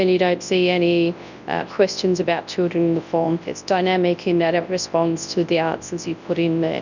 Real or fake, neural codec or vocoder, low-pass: fake; codec, 24 kHz, 0.9 kbps, WavTokenizer, large speech release; 7.2 kHz